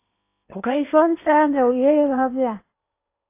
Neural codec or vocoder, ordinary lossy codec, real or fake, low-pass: codec, 16 kHz in and 24 kHz out, 0.8 kbps, FocalCodec, streaming, 65536 codes; AAC, 24 kbps; fake; 3.6 kHz